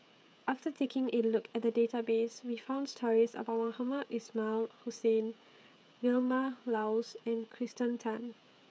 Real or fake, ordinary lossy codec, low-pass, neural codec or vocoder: fake; none; none; codec, 16 kHz, 16 kbps, FreqCodec, smaller model